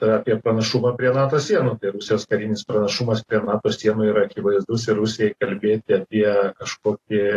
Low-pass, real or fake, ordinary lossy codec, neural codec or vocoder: 14.4 kHz; real; AAC, 48 kbps; none